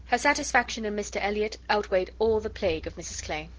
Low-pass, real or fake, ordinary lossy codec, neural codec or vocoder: 7.2 kHz; real; Opus, 16 kbps; none